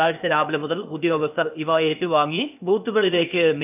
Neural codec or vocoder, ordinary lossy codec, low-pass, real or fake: codec, 16 kHz, 0.8 kbps, ZipCodec; none; 3.6 kHz; fake